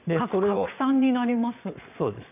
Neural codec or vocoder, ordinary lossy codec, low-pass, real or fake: vocoder, 22.05 kHz, 80 mel bands, Vocos; none; 3.6 kHz; fake